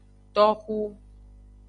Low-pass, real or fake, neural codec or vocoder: 9.9 kHz; real; none